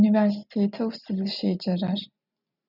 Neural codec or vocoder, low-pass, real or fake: none; 5.4 kHz; real